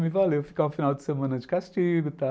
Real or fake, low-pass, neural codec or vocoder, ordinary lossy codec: real; none; none; none